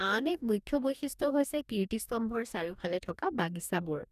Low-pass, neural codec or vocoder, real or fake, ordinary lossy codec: 14.4 kHz; codec, 44.1 kHz, 2.6 kbps, DAC; fake; none